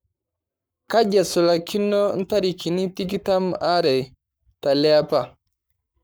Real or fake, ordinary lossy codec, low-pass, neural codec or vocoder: fake; none; none; codec, 44.1 kHz, 7.8 kbps, Pupu-Codec